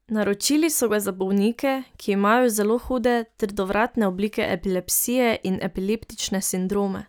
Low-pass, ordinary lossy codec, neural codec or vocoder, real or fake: none; none; none; real